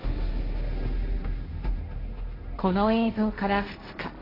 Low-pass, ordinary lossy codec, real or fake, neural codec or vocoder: 5.4 kHz; AAC, 24 kbps; fake; codec, 16 kHz, 1.1 kbps, Voila-Tokenizer